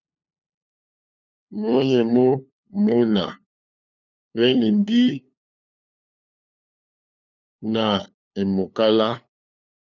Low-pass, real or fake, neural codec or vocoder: 7.2 kHz; fake; codec, 16 kHz, 2 kbps, FunCodec, trained on LibriTTS, 25 frames a second